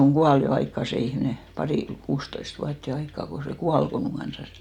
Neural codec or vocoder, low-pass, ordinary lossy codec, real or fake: none; 19.8 kHz; none; real